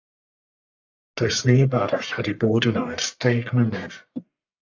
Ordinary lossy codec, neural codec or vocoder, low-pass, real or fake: AAC, 48 kbps; codec, 44.1 kHz, 1.7 kbps, Pupu-Codec; 7.2 kHz; fake